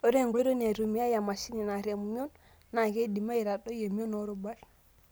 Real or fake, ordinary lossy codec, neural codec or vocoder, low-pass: real; none; none; none